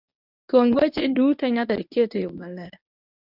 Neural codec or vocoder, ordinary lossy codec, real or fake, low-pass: codec, 24 kHz, 0.9 kbps, WavTokenizer, medium speech release version 1; AAC, 48 kbps; fake; 5.4 kHz